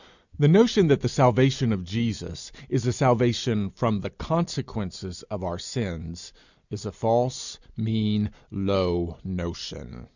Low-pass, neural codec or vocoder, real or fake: 7.2 kHz; none; real